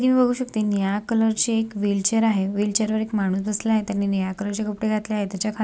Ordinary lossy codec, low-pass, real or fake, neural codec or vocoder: none; none; real; none